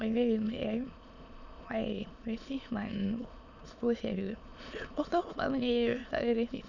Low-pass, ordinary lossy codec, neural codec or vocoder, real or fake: 7.2 kHz; none; autoencoder, 22.05 kHz, a latent of 192 numbers a frame, VITS, trained on many speakers; fake